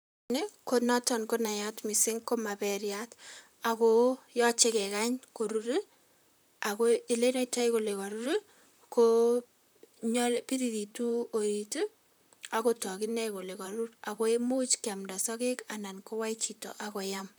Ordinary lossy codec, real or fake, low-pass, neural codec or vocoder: none; fake; none; vocoder, 44.1 kHz, 128 mel bands, Pupu-Vocoder